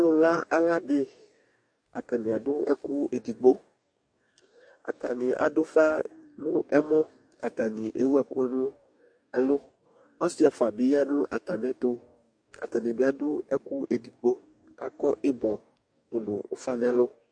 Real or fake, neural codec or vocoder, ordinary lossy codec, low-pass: fake; codec, 44.1 kHz, 2.6 kbps, DAC; MP3, 48 kbps; 9.9 kHz